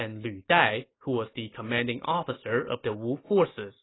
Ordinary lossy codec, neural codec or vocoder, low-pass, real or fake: AAC, 16 kbps; none; 7.2 kHz; real